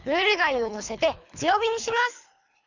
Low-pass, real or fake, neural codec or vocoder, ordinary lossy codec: 7.2 kHz; fake; codec, 24 kHz, 3 kbps, HILCodec; none